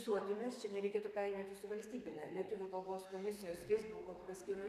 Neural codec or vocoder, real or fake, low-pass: codec, 32 kHz, 1.9 kbps, SNAC; fake; 14.4 kHz